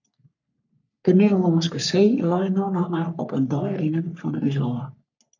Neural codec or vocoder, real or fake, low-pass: codec, 44.1 kHz, 3.4 kbps, Pupu-Codec; fake; 7.2 kHz